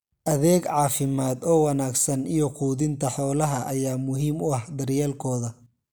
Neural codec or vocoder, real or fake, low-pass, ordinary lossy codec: none; real; none; none